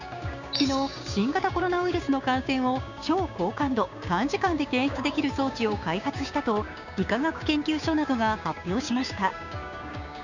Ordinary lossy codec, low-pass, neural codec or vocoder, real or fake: none; 7.2 kHz; codec, 16 kHz, 6 kbps, DAC; fake